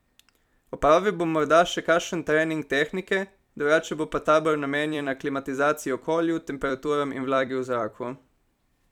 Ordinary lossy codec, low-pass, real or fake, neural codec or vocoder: none; 19.8 kHz; fake; vocoder, 44.1 kHz, 128 mel bands every 256 samples, BigVGAN v2